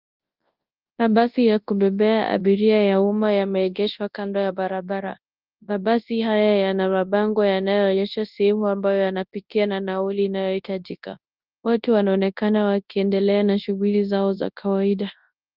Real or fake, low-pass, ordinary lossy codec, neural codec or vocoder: fake; 5.4 kHz; Opus, 32 kbps; codec, 24 kHz, 0.9 kbps, WavTokenizer, large speech release